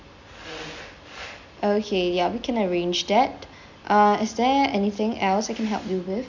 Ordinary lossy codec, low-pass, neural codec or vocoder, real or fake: none; 7.2 kHz; none; real